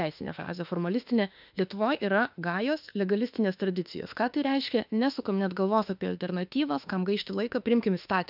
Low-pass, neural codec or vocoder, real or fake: 5.4 kHz; autoencoder, 48 kHz, 32 numbers a frame, DAC-VAE, trained on Japanese speech; fake